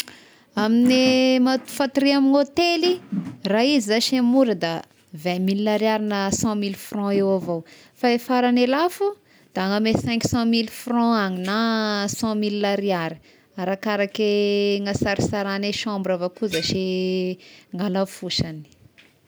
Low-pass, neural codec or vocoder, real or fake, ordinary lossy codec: none; none; real; none